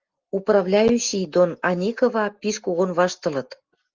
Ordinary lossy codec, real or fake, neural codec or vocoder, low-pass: Opus, 24 kbps; real; none; 7.2 kHz